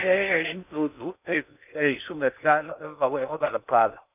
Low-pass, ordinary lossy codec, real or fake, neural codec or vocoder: 3.6 kHz; none; fake; codec, 16 kHz in and 24 kHz out, 0.6 kbps, FocalCodec, streaming, 4096 codes